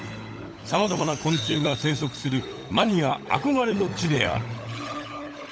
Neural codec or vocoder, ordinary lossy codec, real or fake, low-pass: codec, 16 kHz, 16 kbps, FunCodec, trained on LibriTTS, 50 frames a second; none; fake; none